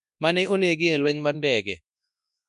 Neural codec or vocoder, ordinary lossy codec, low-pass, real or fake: codec, 24 kHz, 0.9 kbps, WavTokenizer, large speech release; none; 10.8 kHz; fake